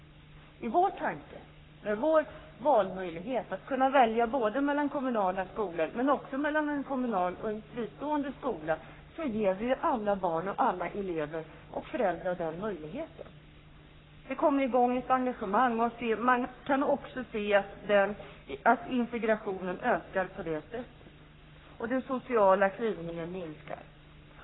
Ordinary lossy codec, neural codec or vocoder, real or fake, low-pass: AAC, 16 kbps; codec, 44.1 kHz, 3.4 kbps, Pupu-Codec; fake; 7.2 kHz